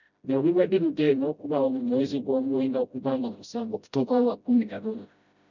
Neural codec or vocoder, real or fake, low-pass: codec, 16 kHz, 0.5 kbps, FreqCodec, smaller model; fake; 7.2 kHz